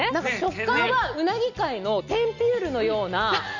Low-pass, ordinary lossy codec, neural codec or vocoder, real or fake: 7.2 kHz; none; none; real